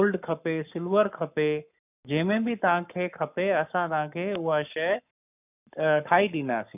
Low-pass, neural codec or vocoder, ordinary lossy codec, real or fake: 3.6 kHz; codec, 44.1 kHz, 7.8 kbps, Pupu-Codec; none; fake